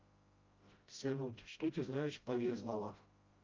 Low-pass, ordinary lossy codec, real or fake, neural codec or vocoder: 7.2 kHz; Opus, 32 kbps; fake; codec, 16 kHz, 0.5 kbps, FreqCodec, smaller model